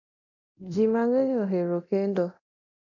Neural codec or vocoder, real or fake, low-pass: codec, 24 kHz, 0.9 kbps, DualCodec; fake; 7.2 kHz